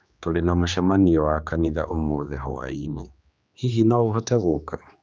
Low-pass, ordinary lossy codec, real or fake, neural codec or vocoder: none; none; fake; codec, 16 kHz, 2 kbps, X-Codec, HuBERT features, trained on general audio